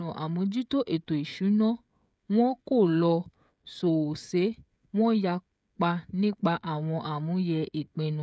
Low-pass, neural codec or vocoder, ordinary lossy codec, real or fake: none; codec, 16 kHz, 16 kbps, FreqCodec, smaller model; none; fake